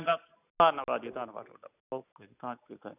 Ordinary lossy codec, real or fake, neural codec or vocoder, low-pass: none; real; none; 3.6 kHz